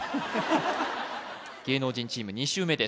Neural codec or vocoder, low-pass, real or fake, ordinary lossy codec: none; none; real; none